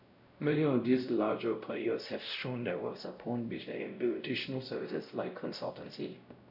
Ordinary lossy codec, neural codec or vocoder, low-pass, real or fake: none; codec, 16 kHz, 0.5 kbps, X-Codec, WavLM features, trained on Multilingual LibriSpeech; 5.4 kHz; fake